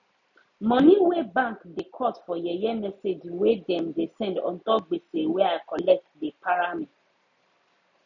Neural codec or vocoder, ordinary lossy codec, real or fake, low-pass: none; none; real; 7.2 kHz